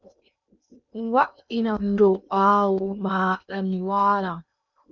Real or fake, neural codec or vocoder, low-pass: fake; codec, 16 kHz in and 24 kHz out, 0.8 kbps, FocalCodec, streaming, 65536 codes; 7.2 kHz